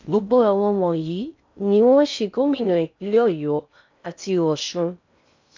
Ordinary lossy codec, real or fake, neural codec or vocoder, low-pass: MP3, 64 kbps; fake; codec, 16 kHz in and 24 kHz out, 0.6 kbps, FocalCodec, streaming, 4096 codes; 7.2 kHz